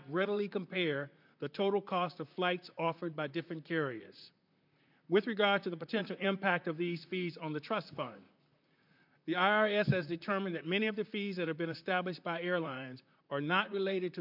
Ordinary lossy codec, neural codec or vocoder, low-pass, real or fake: MP3, 48 kbps; vocoder, 44.1 kHz, 128 mel bands, Pupu-Vocoder; 5.4 kHz; fake